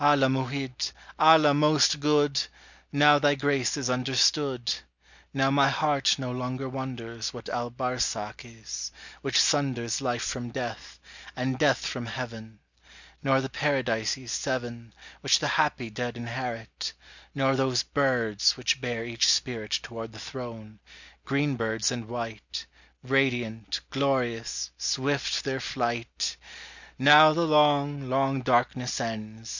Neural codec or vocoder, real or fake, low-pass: none; real; 7.2 kHz